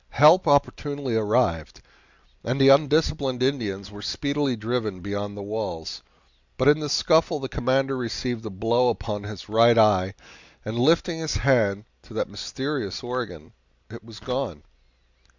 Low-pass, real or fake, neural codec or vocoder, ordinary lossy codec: 7.2 kHz; real; none; Opus, 64 kbps